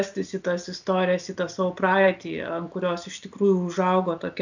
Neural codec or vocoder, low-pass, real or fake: none; 7.2 kHz; real